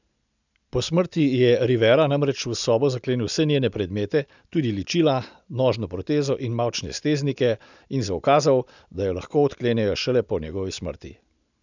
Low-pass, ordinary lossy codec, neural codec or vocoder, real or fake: 7.2 kHz; none; none; real